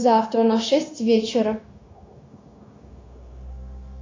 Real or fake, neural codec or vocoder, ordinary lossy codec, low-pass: fake; codec, 16 kHz, 0.9 kbps, LongCat-Audio-Codec; AAC, 32 kbps; 7.2 kHz